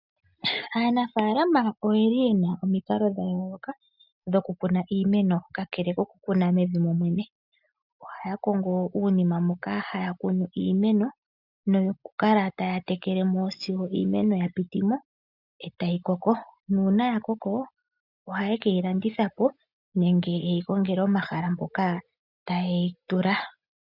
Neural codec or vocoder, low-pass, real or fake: none; 5.4 kHz; real